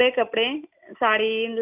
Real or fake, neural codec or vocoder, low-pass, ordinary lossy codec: real; none; 3.6 kHz; none